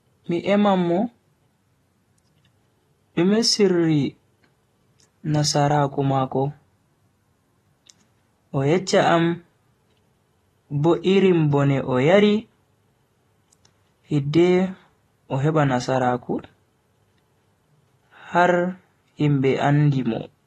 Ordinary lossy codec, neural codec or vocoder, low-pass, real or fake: AAC, 32 kbps; none; 19.8 kHz; real